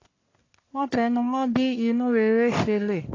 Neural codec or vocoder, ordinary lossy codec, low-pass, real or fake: codec, 44.1 kHz, 1.7 kbps, Pupu-Codec; AAC, 32 kbps; 7.2 kHz; fake